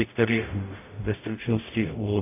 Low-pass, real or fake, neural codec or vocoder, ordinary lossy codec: 3.6 kHz; fake; codec, 44.1 kHz, 0.9 kbps, DAC; AAC, 24 kbps